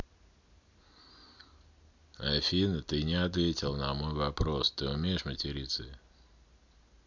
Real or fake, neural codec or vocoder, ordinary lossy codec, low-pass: real; none; MP3, 64 kbps; 7.2 kHz